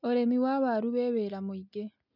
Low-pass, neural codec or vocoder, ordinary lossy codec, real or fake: 5.4 kHz; none; none; real